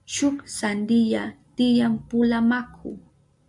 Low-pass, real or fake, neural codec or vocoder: 10.8 kHz; real; none